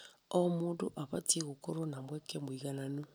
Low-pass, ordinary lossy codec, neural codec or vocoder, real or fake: none; none; none; real